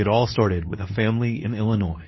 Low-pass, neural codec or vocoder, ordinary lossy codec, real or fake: 7.2 kHz; codec, 24 kHz, 0.9 kbps, WavTokenizer, medium speech release version 2; MP3, 24 kbps; fake